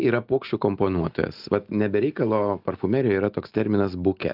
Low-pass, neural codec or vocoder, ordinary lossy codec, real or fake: 5.4 kHz; none; Opus, 24 kbps; real